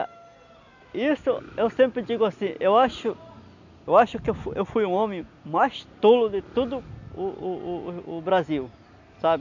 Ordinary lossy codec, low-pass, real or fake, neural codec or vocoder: none; 7.2 kHz; real; none